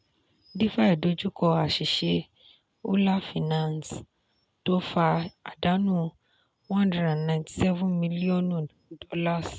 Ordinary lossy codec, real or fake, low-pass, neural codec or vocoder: none; real; none; none